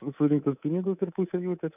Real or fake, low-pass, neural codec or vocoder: fake; 3.6 kHz; codec, 24 kHz, 3.1 kbps, DualCodec